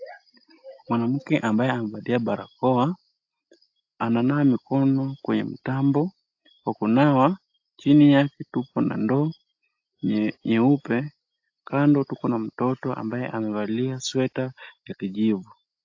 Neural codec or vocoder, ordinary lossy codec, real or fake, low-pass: none; AAC, 48 kbps; real; 7.2 kHz